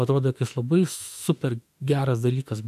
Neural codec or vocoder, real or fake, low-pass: autoencoder, 48 kHz, 32 numbers a frame, DAC-VAE, trained on Japanese speech; fake; 14.4 kHz